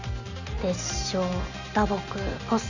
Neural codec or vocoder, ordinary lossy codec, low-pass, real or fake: none; none; 7.2 kHz; real